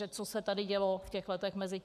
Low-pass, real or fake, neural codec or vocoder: 14.4 kHz; fake; codec, 44.1 kHz, 7.8 kbps, Pupu-Codec